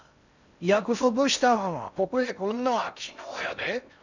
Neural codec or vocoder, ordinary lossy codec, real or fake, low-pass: codec, 16 kHz in and 24 kHz out, 0.6 kbps, FocalCodec, streaming, 4096 codes; none; fake; 7.2 kHz